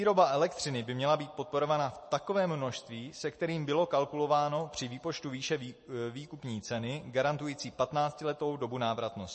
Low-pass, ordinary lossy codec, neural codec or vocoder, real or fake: 10.8 kHz; MP3, 32 kbps; none; real